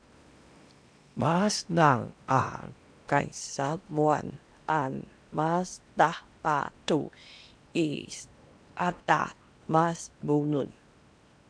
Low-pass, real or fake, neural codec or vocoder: 9.9 kHz; fake; codec, 16 kHz in and 24 kHz out, 0.8 kbps, FocalCodec, streaming, 65536 codes